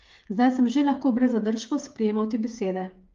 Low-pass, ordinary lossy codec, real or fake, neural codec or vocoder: 7.2 kHz; Opus, 32 kbps; fake; codec, 16 kHz, 8 kbps, FreqCodec, smaller model